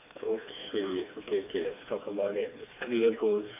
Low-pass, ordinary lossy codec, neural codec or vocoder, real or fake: 3.6 kHz; AAC, 24 kbps; codec, 16 kHz, 2 kbps, FreqCodec, smaller model; fake